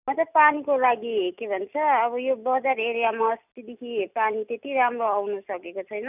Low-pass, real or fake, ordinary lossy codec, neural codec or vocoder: 3.6 kHz; real; none; none